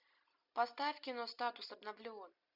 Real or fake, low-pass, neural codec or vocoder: real; 5.4 kHz; none